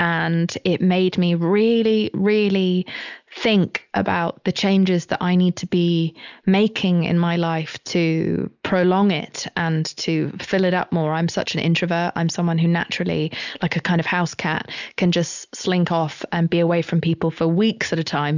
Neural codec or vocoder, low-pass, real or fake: none; 7.2 kHz; real